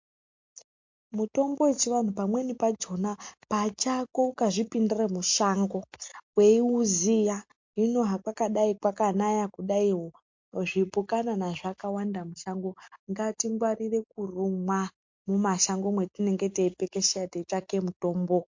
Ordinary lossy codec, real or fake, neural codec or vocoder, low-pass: MP3, 48 kbps; real; none; 7.2 kHz